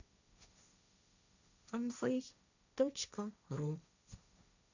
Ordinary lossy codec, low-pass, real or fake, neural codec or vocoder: none; 7.2 kHz; fake; codec, 16 kHz, 1.1 kbps, Voila-Tokenizer